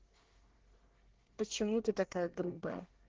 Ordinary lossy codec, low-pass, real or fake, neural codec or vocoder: Opus, 16 kbps; 7.2 kHz; fake; codec, 24 kHz, 1 kbps, SNAC